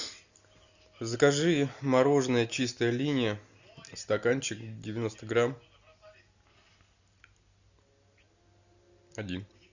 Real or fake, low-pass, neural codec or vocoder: real; 7.2 kHz; none